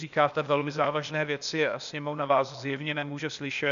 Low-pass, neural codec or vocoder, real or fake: 7.2 kHz; codec, 16 kHz, 0.8 kbps, ZipCodec; fake